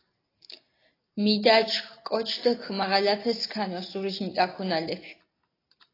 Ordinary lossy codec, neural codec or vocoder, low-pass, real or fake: AAC, 24 kbps; none; 5.4 kHz; real